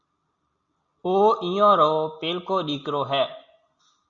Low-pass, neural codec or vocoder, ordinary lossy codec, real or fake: 7.2 kHz; none; Opus, 64 kbps; real